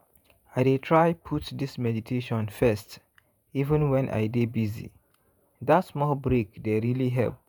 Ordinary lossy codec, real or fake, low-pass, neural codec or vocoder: none; fake; none; vocoder, 48 kHz, 128 mel bands, Vocos